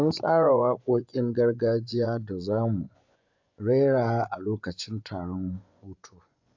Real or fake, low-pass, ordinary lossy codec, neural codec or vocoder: fake; 7.2 kHz; AAC, 48 kbps; vocoder, 24 kHz, 100 mel bands, Vocos